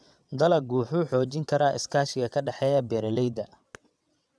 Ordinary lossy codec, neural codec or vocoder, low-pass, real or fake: none; vocoder, 22.05 kHz, 80 mel bands, WaveNeXt; none; fake